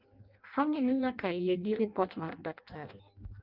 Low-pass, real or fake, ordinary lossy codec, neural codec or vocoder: 5.4 kHz; fake; Opus, 32 kbps; codec, 16 kHz in and 24 kHz out, 0.6 kbps, FireRedTTS-2 codec